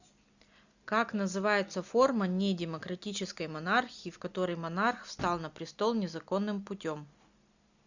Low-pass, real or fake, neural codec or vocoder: 7.2 kHz; real; none